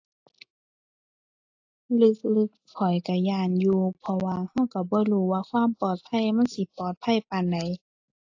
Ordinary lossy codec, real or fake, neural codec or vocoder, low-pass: AAC, 48 kbps; real; none; 7.2 kHz